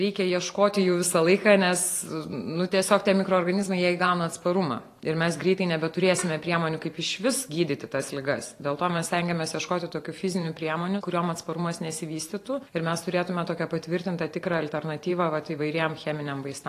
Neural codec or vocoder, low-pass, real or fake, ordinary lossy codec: vocoder, 44.1 kHz, 128 mel bands every 512 samples, BigVGAN v2; 14.4 kHz; fake; AAC, 48 kbps